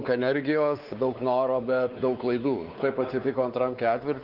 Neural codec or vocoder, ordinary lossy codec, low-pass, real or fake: codec, 16 kHz, 4 kbps, X-Codec, WavLM features, trained on Multilingual LibriSpeech; Opus, 24 kbps; 5.4 kHz; fake